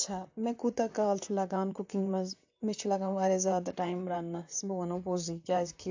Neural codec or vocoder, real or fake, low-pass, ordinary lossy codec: vocoder, 44.1 kHz, 128 mel bands, Pupu-Vocoder; fake; 7.2 kHz; AAC, 48 kbps